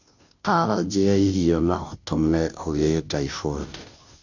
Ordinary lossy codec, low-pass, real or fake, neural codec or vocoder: none; 7.2 kHz; fake; codec, 16 kHz, 0.5 kbps, FunCodec, trained on Chinese and English, 25 frames a second